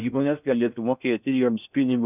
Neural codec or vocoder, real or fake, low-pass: codec, 16 kHz in and 24 kHz out, 0.6 kbps, FocalCodec, streaming, 4096 codes; fake; 3.6 kHz